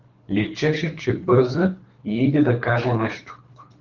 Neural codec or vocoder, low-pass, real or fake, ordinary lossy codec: codec, 24 kHz, 3 kbps, HILCodec; 7.2 kHz; fake; Opus, 32 kbps